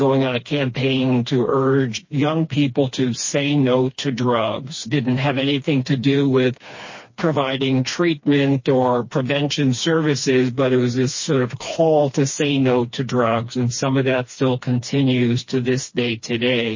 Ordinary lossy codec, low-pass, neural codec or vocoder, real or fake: MP3, 32 kbps; 7.2 kHz; codec, 16 kHz, 2 kbps, FreqCodec, smaller model; fake